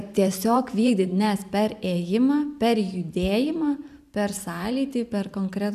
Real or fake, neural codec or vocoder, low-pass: fake; vocoder, 44.1 kHz, 128 mel bands every 512 samples, BigVGAN v2; 14.4 kHz